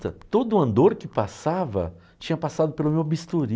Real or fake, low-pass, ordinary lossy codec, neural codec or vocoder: real; none; none; none